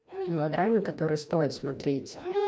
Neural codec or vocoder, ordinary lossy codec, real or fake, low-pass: codec, 16 kHz, 1 kbps, FreqCodec, larger model; none; fake; none